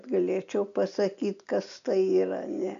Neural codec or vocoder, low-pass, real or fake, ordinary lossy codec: none; 7.2 kHz; real; MP3, 96 kbps